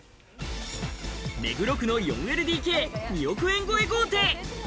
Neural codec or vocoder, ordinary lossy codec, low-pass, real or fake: none; none; none; real